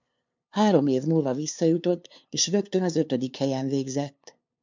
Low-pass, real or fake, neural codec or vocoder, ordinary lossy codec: 7.2 kHz; fake; codec, 16 kHz, 2 kbps, FunCodec, trained on LibriTTS, 25 frames a second; MP3, 64 kbps